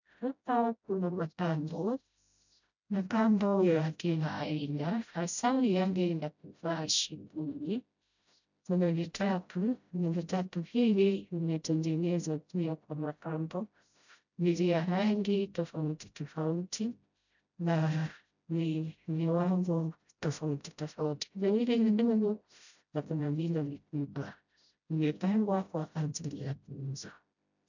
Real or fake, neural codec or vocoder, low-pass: fake; codec, 16 kHz, 0.5 kbps, FreqCodec, smaller model; 7.2 kHz